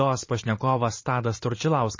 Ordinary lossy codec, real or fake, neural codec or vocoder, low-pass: MP3, 32 kbps; fake; vocoder, 44.1 kHz, 128 mel bands every 512 samples, BigVGAN v2; 7.2 kHz